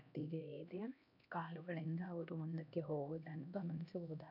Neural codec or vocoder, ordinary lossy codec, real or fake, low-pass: codec, 16 kHz, 1 kbps, X-Codec, HuBERT features, trained on LibriSpeech; none; fake; 5.4 kHz